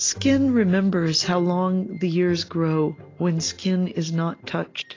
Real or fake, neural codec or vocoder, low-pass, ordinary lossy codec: real; none; 7.2 kHz; AAC, 32 kbps